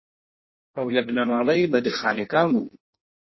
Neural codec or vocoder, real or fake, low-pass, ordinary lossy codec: codec, 16 kHz in and 24 kHz out, 0.6 kbps, FireRedTTS-2 codec; fake; 7.2 kHz; MP3, 24 kbps